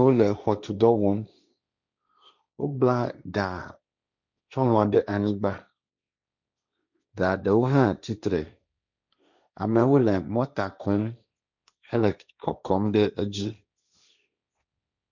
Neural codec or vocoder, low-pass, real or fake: codec, 16 kHz, 1.1 kbps, Voila-Tokenizer; 7.2 kHz; fake